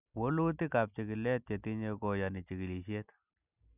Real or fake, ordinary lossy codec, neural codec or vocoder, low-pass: real; none; none; 3.6 kHz